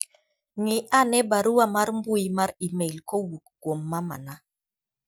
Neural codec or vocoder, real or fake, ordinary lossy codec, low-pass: none; real; none; none